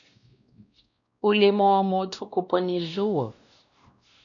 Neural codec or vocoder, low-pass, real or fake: codec, 16 kHz, 1 kbps, X-Codec, WavLM features, trained on Multilingual LibriSpeech; 7.2 kHz; fake